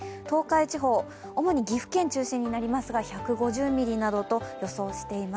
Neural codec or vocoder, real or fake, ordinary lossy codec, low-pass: none; real; none; none